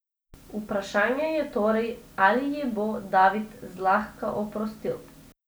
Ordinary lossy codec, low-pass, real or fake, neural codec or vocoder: none; none; real; none